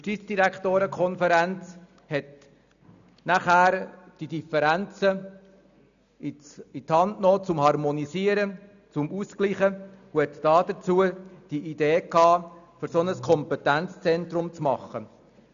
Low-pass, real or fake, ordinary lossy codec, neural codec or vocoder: 7.2 kHz; real; none; none